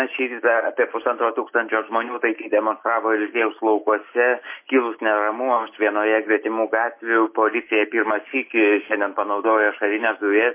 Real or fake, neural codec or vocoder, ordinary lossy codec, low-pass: real; none; MP3, 24 kbps; 3.6 kHz